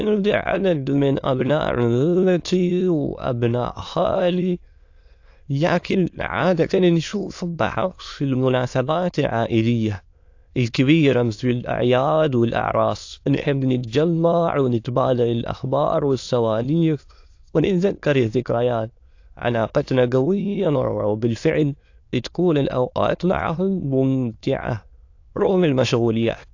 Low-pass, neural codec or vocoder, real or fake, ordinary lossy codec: 7.2 kHz; autoencoder, 22.05 kHz, a latent of 192 numbers a frame, VITS, trained on many speakers; fake; AAC, 48 kbps